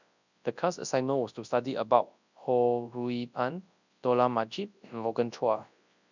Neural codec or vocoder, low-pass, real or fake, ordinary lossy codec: codec, 24 kHz, 0.9 kbps, WavTokenizer, large speech release; 7.2 kHz; fake; none